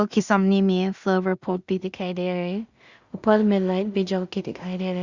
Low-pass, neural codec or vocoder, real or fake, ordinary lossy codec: 7.2 kHz; codec, 16 kHz in and 24 kHz out, 0.4 kbps, LongCat-Audio-Codec, two codebook decoder; fake; Opus, 64 kbps